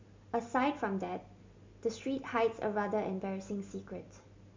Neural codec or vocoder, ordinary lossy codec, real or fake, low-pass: none; MP3, 64 kbps; real; 7.2 kHz